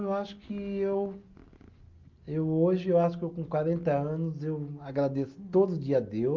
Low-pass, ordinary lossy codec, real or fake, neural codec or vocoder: 7.2 kHz; Opus, 24 kbps; real; none